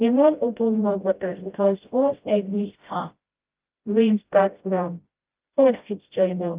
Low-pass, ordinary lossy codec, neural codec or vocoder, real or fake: 3.6 kHz; Opus, 24 kbps; codec, 16 kHz, 0.5 kbps, FreqCodec, smaller model; fake